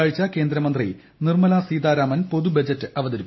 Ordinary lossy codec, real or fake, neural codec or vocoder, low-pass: MP3, 24 kbps; real; none; 7.2 kHz